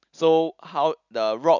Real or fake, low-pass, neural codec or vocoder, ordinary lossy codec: real; 7.2 kHz; none; none